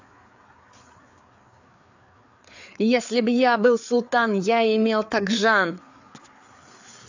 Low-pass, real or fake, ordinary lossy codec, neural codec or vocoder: 7.2 kHz; fake; AAC, 48 kbps; codec, 16 kHz, 4 kbps, FreqCodec, larger model